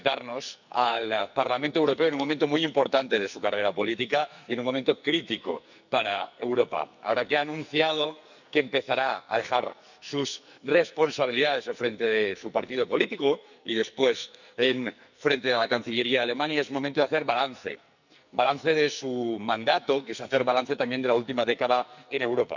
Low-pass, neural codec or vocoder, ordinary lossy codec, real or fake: 7.2 kHz; codec, 44.1 kHz, 2.6 kbps, SNAC; none; fake